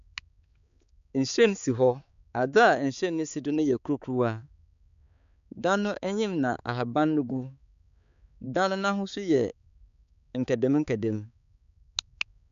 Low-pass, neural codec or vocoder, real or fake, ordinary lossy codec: 7.2 kHz; codec, 16 kHz, 4 kbps, X-Codec, HuBERT features, trained on balanced general audio; fake; none